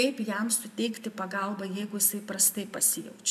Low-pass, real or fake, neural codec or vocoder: 14.4 kHz; fake; vocoder, 44.1 kHz, 128 mel bands every 256 samples, BigVGAN v2